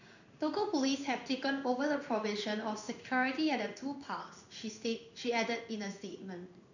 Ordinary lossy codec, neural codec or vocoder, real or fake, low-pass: none; codec, 16 kHz in and 24 kHz out, 1 kbps, XY-Tokenizer; fake; 7.2 kHz